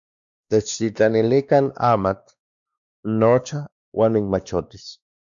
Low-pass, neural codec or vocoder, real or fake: 7.2 kHz; codec, 16 kHz, 2 kbps, X-Codec, WavLM features, trained on Multilingual LibriSpeech; fake